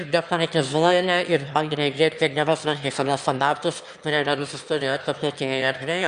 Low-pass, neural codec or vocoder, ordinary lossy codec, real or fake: 9.9 kHz; autoencoder, 22.05 kHz, a latent of 192 numbers a frame, VITS, trained on one speaker; Opus, 64 kbps; fake